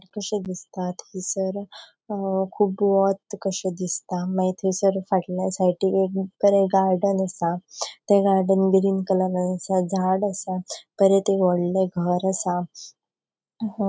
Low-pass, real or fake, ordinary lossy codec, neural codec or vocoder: none; real; none; none